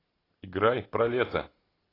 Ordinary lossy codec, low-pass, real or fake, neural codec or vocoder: AAC, 24 kbps; 5.4 kHz; real; none